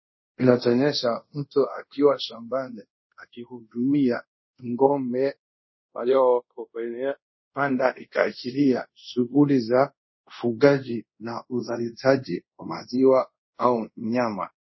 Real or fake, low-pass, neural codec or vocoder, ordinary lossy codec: fake; 7.2 kHz; codec, 24 kHz, 0.5 kbps, DualCodec; MP3, 24 kbps